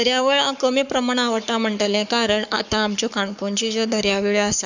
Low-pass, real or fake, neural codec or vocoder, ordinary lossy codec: 7.2 kHz; fake; codec, 44.1 kHz, 7.8 kbps, Pupu-Codec; none